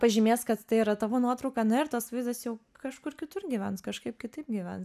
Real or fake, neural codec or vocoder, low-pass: real; none; 14.4 kHz